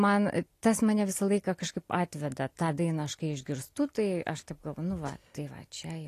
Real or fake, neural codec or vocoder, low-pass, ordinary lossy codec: real; none; 14.4 kHz; AAC, 48 kbps